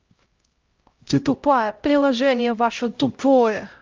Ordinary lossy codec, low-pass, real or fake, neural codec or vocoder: Opus, 32 kbps; 7.2 kHz; fake; codec, 16 kHz, 0.5 kbps, X-Codec, HuBERT features, trained on LibriSpeech